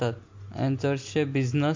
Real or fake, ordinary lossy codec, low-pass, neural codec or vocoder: real; MP3, 48 kbps; 7.2 kHz; none